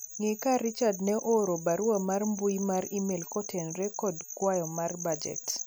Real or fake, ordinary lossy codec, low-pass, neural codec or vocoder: real; none; none; none